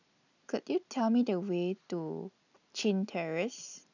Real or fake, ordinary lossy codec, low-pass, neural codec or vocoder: real; none; 7.2 kHz; none